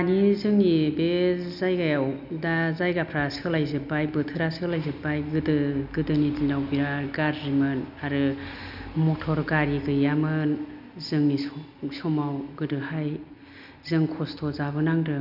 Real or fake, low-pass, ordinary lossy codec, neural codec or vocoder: real; 5.4 kHz; none; none